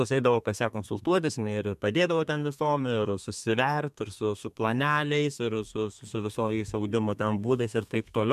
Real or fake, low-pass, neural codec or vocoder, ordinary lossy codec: fake; 14.4 kHz; codec, 32 kHz, 1.9 kbps, SNAC; MP3, 96 kbps